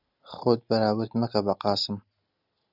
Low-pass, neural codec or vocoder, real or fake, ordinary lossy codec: 5.4 kHz; none; real; Opus, 64 kbps